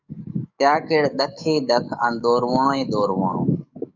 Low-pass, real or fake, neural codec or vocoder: 7.2 kHz; fake; codec, 44.1 kHz, 7.8 kbps, DAC